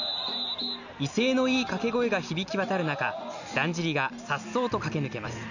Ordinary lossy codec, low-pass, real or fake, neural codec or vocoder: none; 7.2 kHz; real; none